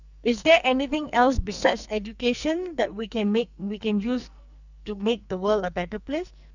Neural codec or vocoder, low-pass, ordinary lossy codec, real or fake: codec, 44.1 kHz, 2.6 kbps, SNAC; 7.2 kHz; none; fake